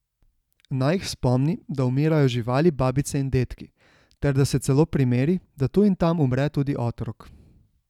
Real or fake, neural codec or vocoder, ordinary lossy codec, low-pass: real; none; none; 19.8 kHz